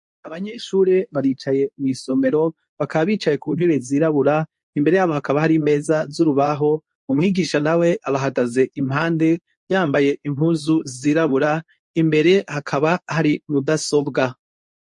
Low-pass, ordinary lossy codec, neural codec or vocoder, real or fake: 10.8 kHz; MP3, 48 kbps; codec, 24 kHz, 0.9 kbps, WavTokenizer, medium speech release version 2; fake